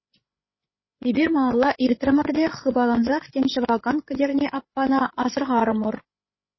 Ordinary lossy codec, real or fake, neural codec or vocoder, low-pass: MP3, 24 kbps; fake; codec, 16 kHz, 8 kbps, FreqCodec, larger model; 7.2 kHz